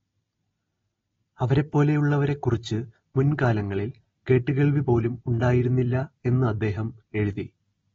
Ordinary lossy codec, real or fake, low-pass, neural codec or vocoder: AAC, 24 kbps; real; 7.2 kHz; none